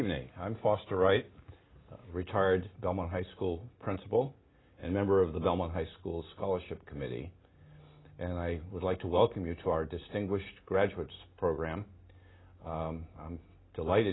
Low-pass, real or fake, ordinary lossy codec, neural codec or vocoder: 7.2 kHz; real; AAC, 16 kbps; none